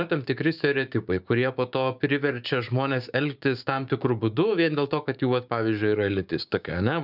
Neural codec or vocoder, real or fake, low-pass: codec, 16 kHz, 6 kbps, DAC; fake; 5.4 kHz